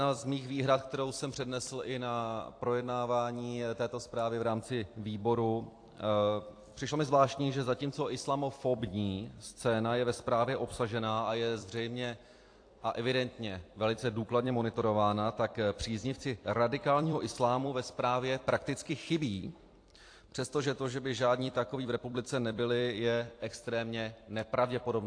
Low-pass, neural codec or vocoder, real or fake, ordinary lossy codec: 9.9 kHz; vocoder, 44.1 kHz, 128 mel bands every 256 samples, BigVGAN v2; fake; AAC, 48 kbps